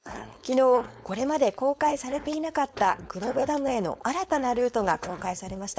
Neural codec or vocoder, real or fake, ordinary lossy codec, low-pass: codec, 16 kHz, 4.8 kbps, FACodec; fake; none; none